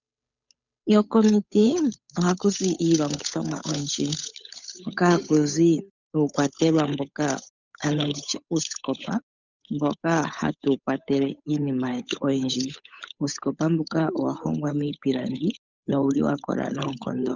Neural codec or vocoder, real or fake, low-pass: codec, 16 kHz, 8 kbps, FunCodec, trained on Chinese and English, 25 frames a second; fake; 7.2 kHz